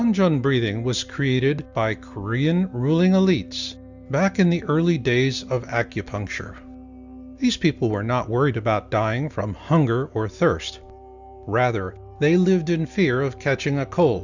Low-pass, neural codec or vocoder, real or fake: 7.2 kHz; none; real